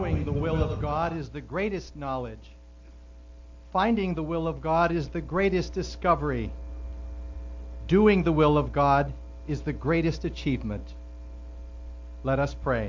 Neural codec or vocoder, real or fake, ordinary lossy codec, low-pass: none; real; MP3, 48 kbps; 7.2 kHz